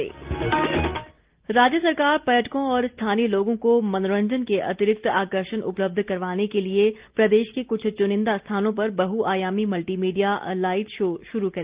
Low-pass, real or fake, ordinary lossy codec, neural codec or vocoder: 3.6 kHz; real; Opus, 32 kbps; none